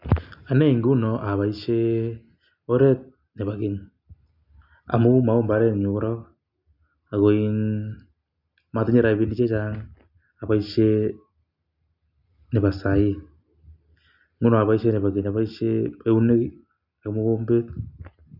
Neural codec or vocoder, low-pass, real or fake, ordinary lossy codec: none; 5.4 kHz; real; none